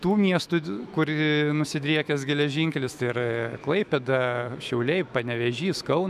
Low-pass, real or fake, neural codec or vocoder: 14.4 kHz; fake; autoencoder, 48 kHz, 128 numbers a frame, DAC-VAE, trained on Japanese speech